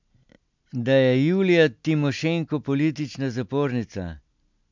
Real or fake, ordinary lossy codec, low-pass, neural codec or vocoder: real; MP3, 64 kbps; 7.2 kHz; none